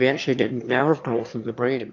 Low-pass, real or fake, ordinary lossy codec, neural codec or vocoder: 7.2 kHz; fake; AAC, 48 kbps; autoencoder, 22.05 kHz, a latent of 192 numbers a frame, VITS, trained on one speaker